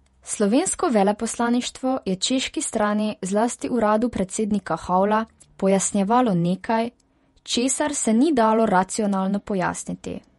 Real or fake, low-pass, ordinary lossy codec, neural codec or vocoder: fake; 19.8 kHz; MP3, 48 kbps; vocoder, 48 kHz, 128 mel bands, Vocos